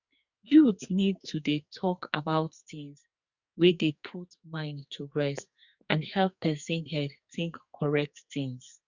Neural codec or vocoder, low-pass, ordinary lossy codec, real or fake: codec, 44.1 kHz, 2.6 kbps, SNAC; 7.2 kHz; Opus, 64 kbps; fake